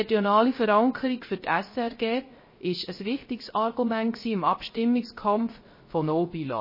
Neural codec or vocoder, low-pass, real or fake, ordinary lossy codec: codec, 16 kHz, 0.3 kbps, FocalCodec; 5.4 kHz; fake; MP3, 24 kbps